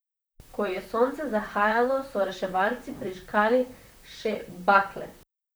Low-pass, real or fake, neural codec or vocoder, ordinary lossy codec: none; fake; vocoder, 44.1 kHz, 128 mel bands, Pupu-Vocoder; none